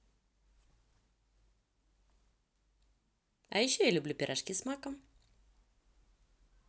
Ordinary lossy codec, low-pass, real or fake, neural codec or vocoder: none; none; real; none